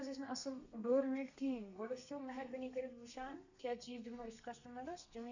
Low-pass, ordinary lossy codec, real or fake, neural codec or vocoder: 7.2 kHz; none; fake; codec, 32 kHz, 1.9 kbps, SNAC